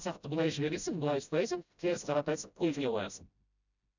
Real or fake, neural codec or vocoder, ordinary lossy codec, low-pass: fake; codec, 16 kHz, 0.5 kbps, FreqCodec, smaller model; none; 7.2 kHz